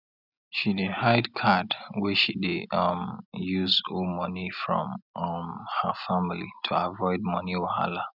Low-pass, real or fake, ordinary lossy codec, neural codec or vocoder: 5.4 kHz; real; none; none